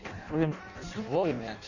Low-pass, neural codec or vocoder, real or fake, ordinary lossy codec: 7.2 kHz; codec, 16 kHz in and 24 kHz out, 0.6 kbps, FireRedTTS-2 codec; fake; none